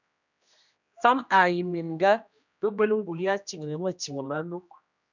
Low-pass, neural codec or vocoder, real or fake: 7.2 kHz; codec, 16 kHz, 1 kbps, X-Codec, HuBERT features, trained on general audio; fake